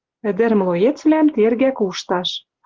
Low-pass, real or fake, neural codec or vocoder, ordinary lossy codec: 7.2 kHz; real; none; Opus, 16 kbps